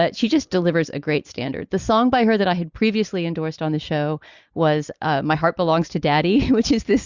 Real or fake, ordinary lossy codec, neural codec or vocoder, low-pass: real; Opus, 64 kbps; none; 7.2 kHz